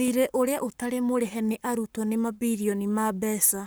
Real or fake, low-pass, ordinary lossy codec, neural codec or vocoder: fake; none; none; codec, 44.1 kHz, 7.8 kbps, Pupu-Codec